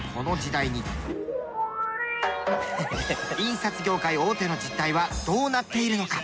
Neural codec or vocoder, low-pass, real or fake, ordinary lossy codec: none; none; real; none